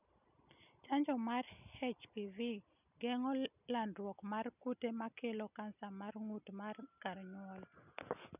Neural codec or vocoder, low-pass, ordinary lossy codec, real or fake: none; 3.6 kHz; none; real